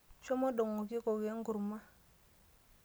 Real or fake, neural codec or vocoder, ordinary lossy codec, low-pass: real; none; none; none